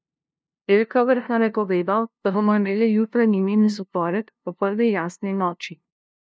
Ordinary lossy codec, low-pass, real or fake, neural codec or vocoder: none; none; fake; codec, 16 kHz, 0.5 kbps, FunCodec, trained on LibriTTS, 25 frames a second